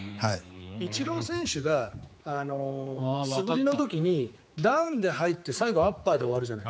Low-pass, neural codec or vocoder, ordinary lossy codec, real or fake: none; codec, 16 kHz, 4 kbps, X-Codec, HuBERT features, trained on general audio; none; fake